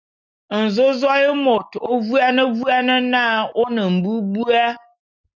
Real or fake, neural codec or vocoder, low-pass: real; none; 7.2 kHz